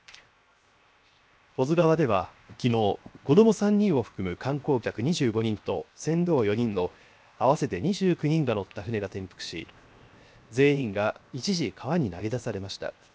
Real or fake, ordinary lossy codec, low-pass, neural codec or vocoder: fake; none; none; codec, 16 kHz, 0.7 kbps, FocalCodec